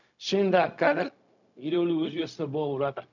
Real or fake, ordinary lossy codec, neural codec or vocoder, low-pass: fake; none; codec, 16 kHz, 0.4 kbps, LongCat-Audio-Codec; 7.2 kHz